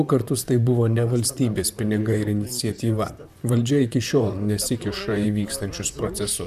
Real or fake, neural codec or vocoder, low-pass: fake; vocoder, 44.1 kHz, 128 mel bands, Pupu-Vocoder; 14.4 kHz